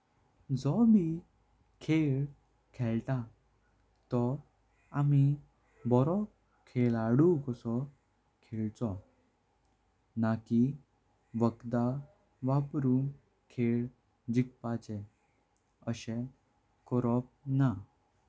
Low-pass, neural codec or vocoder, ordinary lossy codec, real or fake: none; none; none; real